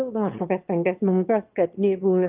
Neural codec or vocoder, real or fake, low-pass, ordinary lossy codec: autoencoder, 22.05 kHz, a latent of 192 numbers a frame, VITS, trained on one speaker; fake; 3.6 kHz; Opus, 24 kbps